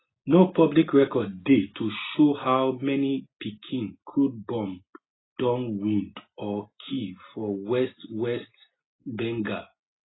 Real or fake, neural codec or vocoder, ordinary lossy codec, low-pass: real; none; AAC, 16 kbps; 7.2 kHz